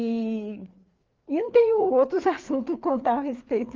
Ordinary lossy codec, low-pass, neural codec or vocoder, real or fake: Opus, 32 kbps; 7.2 kHz; codec, 16 kHz, 4 kbps, FreqCodec, larger model; fake